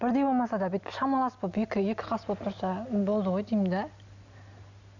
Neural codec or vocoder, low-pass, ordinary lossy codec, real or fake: none; 7.2 kHz; none; real